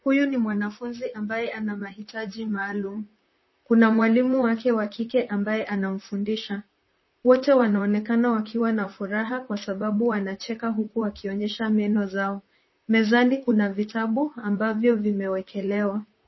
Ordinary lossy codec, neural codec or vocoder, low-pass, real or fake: MP3, 24 kbps; vocoder, 44.1 kHz, 128 mel bands, Pupu-Vocoder; 7.2 kHz; fake